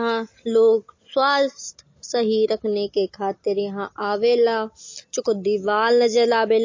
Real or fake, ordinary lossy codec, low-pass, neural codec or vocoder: real; MP3, 32 kbps; 7.2 kHz; none